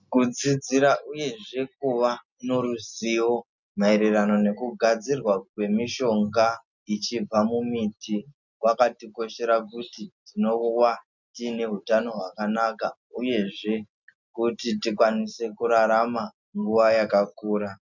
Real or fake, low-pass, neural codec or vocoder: real; 7.2 kHz; none